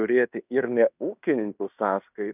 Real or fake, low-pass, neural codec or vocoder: fake; 3.6 kHz; codec, 16 kHz in and 24 kHz out, 0.9 kbps, LongCat-Audio-Codec, fine tuned four codebook decoder